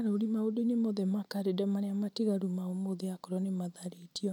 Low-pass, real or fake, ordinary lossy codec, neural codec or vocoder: 19.8 kHz; real; none; none